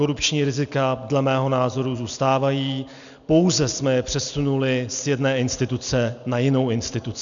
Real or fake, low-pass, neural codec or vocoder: real; 7.2 kHz; none